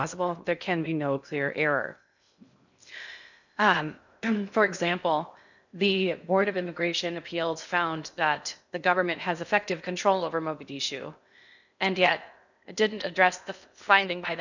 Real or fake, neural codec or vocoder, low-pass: fake; codec, 16 kHz in and 24 kHz out, 0.6 kbps, FocalCodec, streaming, 2048 codes; 7.2 kHz